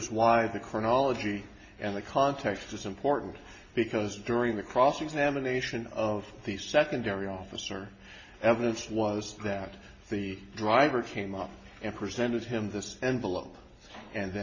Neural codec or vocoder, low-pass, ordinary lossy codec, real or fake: none; 7.2 kHz; MP3, 32 kbps; real